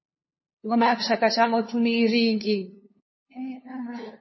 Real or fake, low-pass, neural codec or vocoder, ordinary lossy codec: fake; 7.2 kHz; codec, 16 kHz, 2 kbps, FunCodec, trained on LibriTTS, 25 frames a second; MP3, 24 kbps